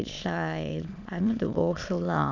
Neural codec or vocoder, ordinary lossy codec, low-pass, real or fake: autoencoder, 22.05 kHz, a latent of 192 numbers a frame, VITS, trained on many speakers; none; 7.2 kHz; fake